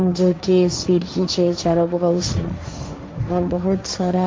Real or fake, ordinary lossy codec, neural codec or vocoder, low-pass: fake; none; codec, 16 kHz, 1.1 kbps, Voila-Tokenizer; none